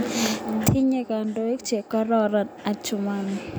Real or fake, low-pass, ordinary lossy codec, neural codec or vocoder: real; none; none; none